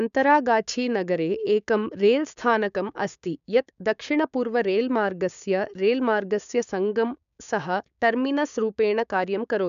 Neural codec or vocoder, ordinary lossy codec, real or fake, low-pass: codec, 16 kHz, 6 kbps, DAC; none; fake; 7.2 kHz